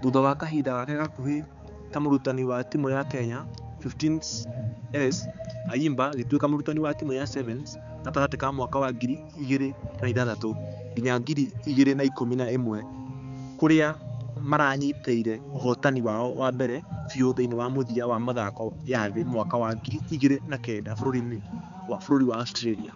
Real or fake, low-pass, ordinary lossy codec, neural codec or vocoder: fake; 7.2 kHz; none; codec, 16 kHz, 4 kbps, X-Codec, HuBERT features, trained on balanced general audio